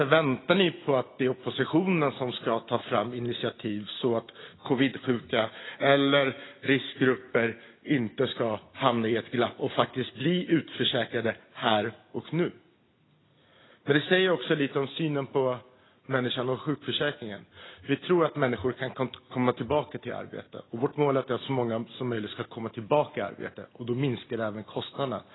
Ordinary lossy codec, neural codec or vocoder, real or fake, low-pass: AAC, 16 kbps; none; real; 7.2 kHz